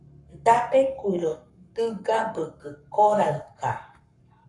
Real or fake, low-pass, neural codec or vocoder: fake; 10.8 kHz; codec, 44.1 kHz, 7.8 kbps, Pupu-Codec